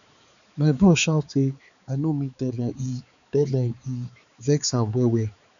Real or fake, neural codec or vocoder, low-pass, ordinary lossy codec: fake; codec, 16 kHz, 4 kbps, X-Codec, HuBERT features, trained on balanced general audio; 7.2 kHz; none